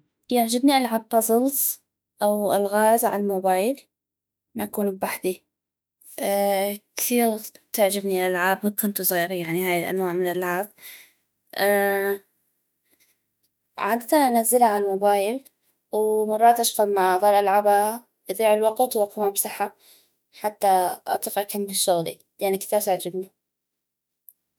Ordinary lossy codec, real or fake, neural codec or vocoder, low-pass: none; fake; autoencoder, 48 kHz, 32 numbers a frame, DAC-VAE, trained on Japanese speech; none